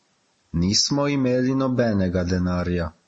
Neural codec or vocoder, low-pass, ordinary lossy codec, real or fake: none; 10.8 kHz; MP3, 32 kbps; real